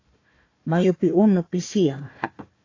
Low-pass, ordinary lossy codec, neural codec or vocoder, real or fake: 7.2 kHz; AAC, 32 kbps; codec, 16 kHz, 1 kbps, FunCodec, trained on Chinese and English, 50 frames a second; fake